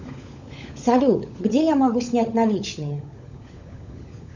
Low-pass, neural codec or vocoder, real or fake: 7.2 kHz; codec, 16 kHz, 16 kbps, FunCodec, trained on LibriTTS, 50 frames a second; fake